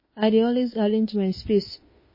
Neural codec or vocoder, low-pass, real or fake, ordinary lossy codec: autoencoder, 48 kHz, 32 numbers a frame, DAC-VAE, trained on Japanese speech; 5.4 kHz; fake; MP3, 24 kbps